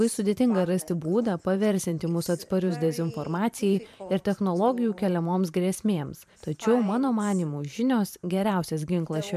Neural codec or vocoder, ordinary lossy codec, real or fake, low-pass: vocoder, 44.1 kHz, 128 mel bands every 512 samples, BigVGAN v2; MP3, 96 kbps; fake; 14.4 kHz